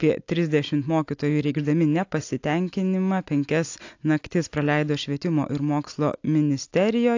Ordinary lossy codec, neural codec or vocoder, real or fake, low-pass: AAC, 48 kbps; none; real; 7.2 kHz